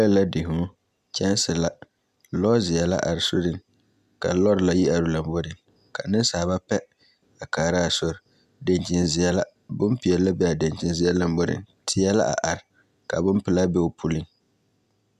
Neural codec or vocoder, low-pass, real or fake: none; 14.4 kHz; real